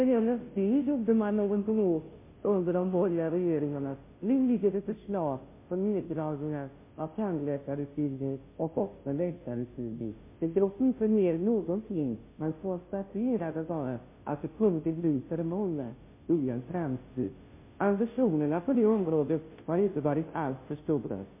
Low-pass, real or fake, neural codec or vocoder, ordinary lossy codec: 3.6 kHz; fake; codec, 16 kHz, 0.5 kbps, FunCodec, trained on Chinese and English, 25 frames a second; MP3, 24 kbps